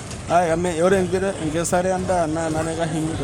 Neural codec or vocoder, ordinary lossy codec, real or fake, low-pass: codec, 44.1 kHz, 7.8 kbps, Pupu-Codec; none; fake; none